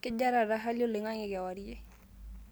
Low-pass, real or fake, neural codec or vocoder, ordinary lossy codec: none; real; none; none